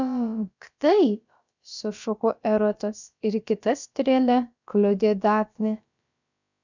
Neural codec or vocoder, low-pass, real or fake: codec, 16 kHz, about 1 kbps, DyCAST, with the encoder's durations; 7.2 kHz; fake